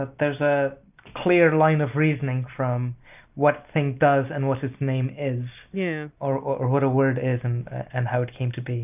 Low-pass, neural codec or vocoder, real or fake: 3.6 kHz; none; real